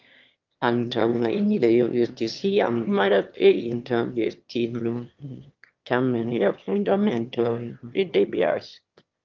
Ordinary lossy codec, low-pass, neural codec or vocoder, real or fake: Opus, 32 kbps; 7.2 kHz; autoencoder, 22.05 kHz, a latent of 192 numbers a frame, VITS, trained on one speaker; fake